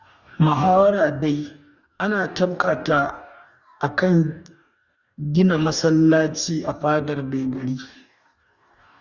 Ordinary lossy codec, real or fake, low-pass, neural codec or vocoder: Opus, 64 kbps; fake; 7.2 kHz; codec, 44.1 kHz, 2.6 kbps, DAC